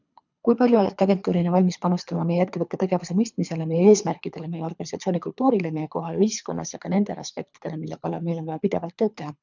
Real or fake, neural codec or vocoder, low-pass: fake; codec, 24 kHz, 3 kbps, HILCodec; 7.2 kHz